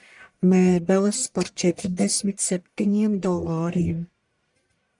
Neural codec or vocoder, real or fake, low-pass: codec, 44.1 kHz, 1.7 kbps, Pupu-Codec; fake; 10.8 kHz